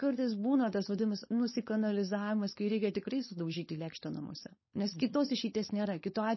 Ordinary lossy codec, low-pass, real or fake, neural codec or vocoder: MP3, 24 kbps; 7.2 kHz; fake; codec, 16 kHz, 4.8 kbps, FACodec